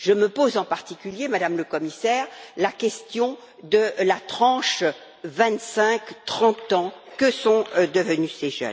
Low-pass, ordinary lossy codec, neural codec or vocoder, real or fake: none; none; none; real